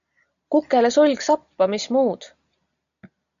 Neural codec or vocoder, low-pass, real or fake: none; 7.2 kHz; real